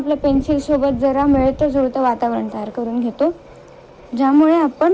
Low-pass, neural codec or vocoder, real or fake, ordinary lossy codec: none; none; real; none